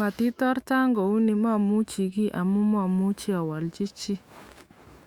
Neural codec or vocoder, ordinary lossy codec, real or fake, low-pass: autoencoder, 48 kHz, 128 numbers a frame, DAC-VAE, trained on Japanese speech; Opus, 64 kbps; fake; 19.8 kHz